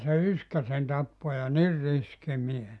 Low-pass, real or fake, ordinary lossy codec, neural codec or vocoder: none; real; none; none